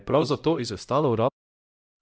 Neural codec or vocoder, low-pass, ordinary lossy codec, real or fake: codec, 16 kHz, 0.5 kbps, X-Codec, HuBERT features, trained on LibriSpeech; none; none; fake